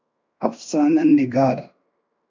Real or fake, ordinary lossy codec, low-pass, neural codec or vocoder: fake; MP3, 64 kbps; 7.2 kHz; codec, 16 kHz in and 24 kHz out, 0.9 kbps, LongCat-Audio-Codec, fine tuned four codebook decoder